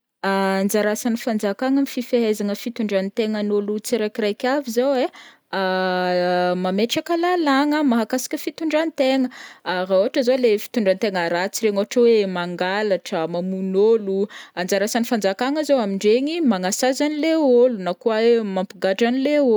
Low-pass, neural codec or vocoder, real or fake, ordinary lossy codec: none; none; real; none